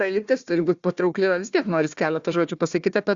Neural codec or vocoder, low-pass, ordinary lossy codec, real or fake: codec, 16 kHz, 1 kbps, FunCodec, trained on Chinese and English, 50 frames a second; 7.2 kHz; Opus, 64 kbps; fake